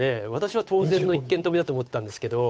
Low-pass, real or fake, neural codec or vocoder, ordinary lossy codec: none; fake; codec, 16 kHz, 8 kbps, FunCodec, trained on Chinese and English, 25 frames a second; none